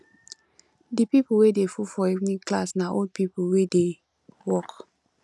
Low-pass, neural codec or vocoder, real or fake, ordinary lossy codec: none; none; real; none